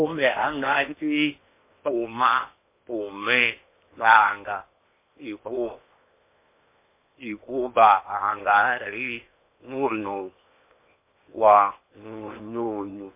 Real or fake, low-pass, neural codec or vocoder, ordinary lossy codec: fake; 3.6 kHz; codec, 16 kHz in and 24 kHz out, 0.8 kbps, FocalCodec, streaming, 65536 codes; MP3, 24 kbps